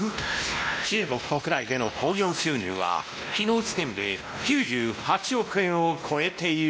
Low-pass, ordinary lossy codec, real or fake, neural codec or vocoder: none; none; fake; codec, 16 kHz, 1 kbps, X-Codec, WavLM features, trained on Multilingual LibriSpeech